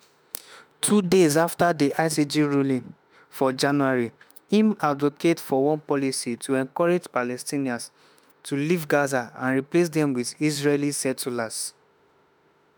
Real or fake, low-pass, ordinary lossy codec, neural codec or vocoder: fake; none; none; autoencoder, 48 kHz, 32 numbers a frame, DAC-VAE, trained on Japanese speech